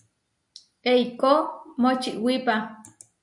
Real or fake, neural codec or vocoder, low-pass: real; none; 10.8 kHz